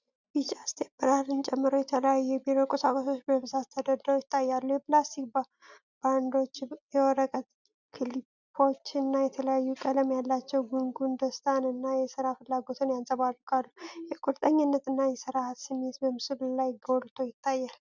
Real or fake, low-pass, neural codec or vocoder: real; 7.2 kHz; none